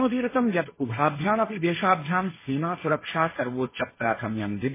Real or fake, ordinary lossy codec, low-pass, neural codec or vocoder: fake; MP3, 16 kbps; 3.6 kHz; codec, 16 kHz, 1.1 kbps, Voila-Tokenizer